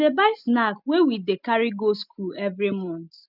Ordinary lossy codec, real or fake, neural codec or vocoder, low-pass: none; real; none; 5.4 kHz